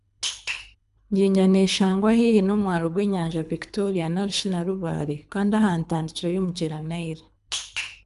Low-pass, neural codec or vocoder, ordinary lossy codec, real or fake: 10.8 kHz; codec, 24 kHz, 3 kbps, HILCodec; none; fake